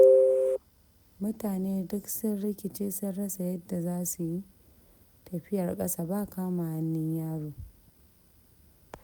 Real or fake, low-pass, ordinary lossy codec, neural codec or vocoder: real; none; none; none